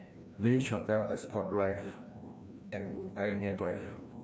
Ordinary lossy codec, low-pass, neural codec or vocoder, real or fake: none; none; codec, 16 kHz, 1 kbps, FreqCodec, larger model; fake